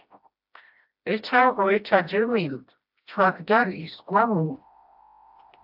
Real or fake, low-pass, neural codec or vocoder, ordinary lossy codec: fake; 5.4 kHz; codec, 16 kHz, 1 kbps, FreqCodec, smaller model; AAC, 48 kbps